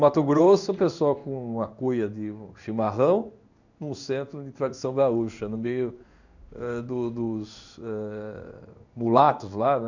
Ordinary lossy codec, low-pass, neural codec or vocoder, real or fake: none; 7.2 kHz; codec, 16 kHz in and 24 kHz out, 1 kbps, XY-Tokenizer; fake